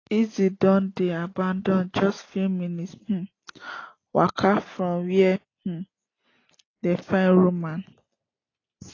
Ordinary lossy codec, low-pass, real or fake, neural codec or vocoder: AAC, 32 kbps; 7.2 kHz; real; none